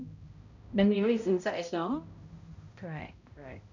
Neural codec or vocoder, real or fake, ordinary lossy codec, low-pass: codec, 16 kHz, 0.5 kbps, X-Codec, HuBERT features, trained on balanced general audio; fake; none; 7.2 kHz